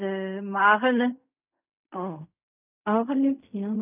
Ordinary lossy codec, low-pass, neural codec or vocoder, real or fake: none; 3.6 kHz; codec, 16 kHz in and 24 kHz out, 0.4 kbps, LongCat-Audio-Codec, fine tuned four codebook decoder; fake